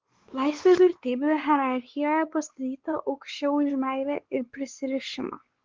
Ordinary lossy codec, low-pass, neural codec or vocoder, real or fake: Opus, 16 kbps; 7.2 kHz; codec, 16 kHz, 4 kbps, X-Codec, WavLM features, trained on Multilingual LibriSpeech; fake